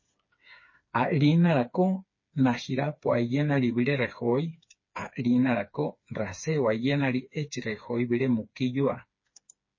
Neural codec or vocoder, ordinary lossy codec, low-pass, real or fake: codec, 16 kHz, 4 kbps, FreqCodec, smaller model; MP3, 32 kbps; 7.2 kHz; fake